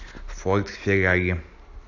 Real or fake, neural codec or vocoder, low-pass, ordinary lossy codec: real; none; 7.2 kHz; AAC, 48 kbps